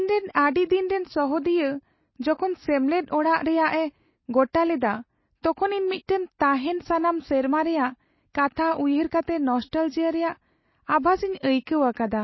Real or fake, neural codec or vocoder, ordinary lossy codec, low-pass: real; none; MP3, 24 kbps; 7.2 kHz